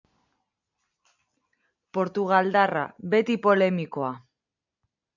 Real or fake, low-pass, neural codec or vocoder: real; 7.2 kHz; none